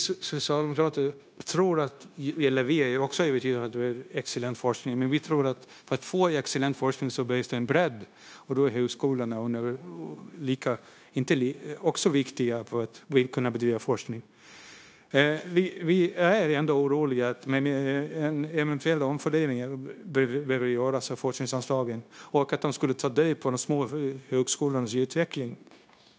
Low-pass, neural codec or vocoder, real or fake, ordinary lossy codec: none; codec, 16 kHz, 0.9 kbps, LongCat-Audio-Codec; fake; none